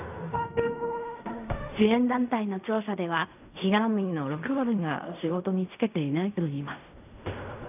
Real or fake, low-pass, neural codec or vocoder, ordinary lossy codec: fake; 3.6 kHz; codec, 16 kHz in and 24 kHz out, 0.4 kbps, LongCat-Audio-Codec, fine tuned four codebook decoder; none